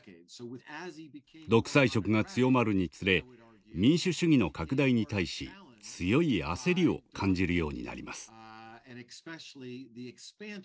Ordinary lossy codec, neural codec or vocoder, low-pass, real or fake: none; none; none; real